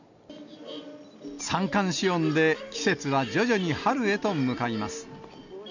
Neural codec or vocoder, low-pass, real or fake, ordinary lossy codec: none; 7.2 kHz; real; none